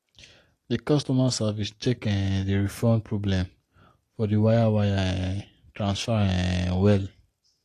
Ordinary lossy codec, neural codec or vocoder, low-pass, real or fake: AAC, 48 kbps; none; 14.4 kHz; real